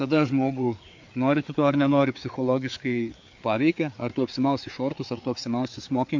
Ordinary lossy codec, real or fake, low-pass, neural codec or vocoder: MP3, 48 kbps; fake; 7.2 kHz; codec, 16 kHz, 4 kbps, FreqCodec, larger model